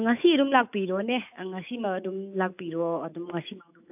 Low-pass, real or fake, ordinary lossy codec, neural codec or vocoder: 3.6 kHz; fake; AAC, 32 kbps; autoencoder, 48 kHz, 128 numbers a frame, DAC-VAE, trained on Japanese speech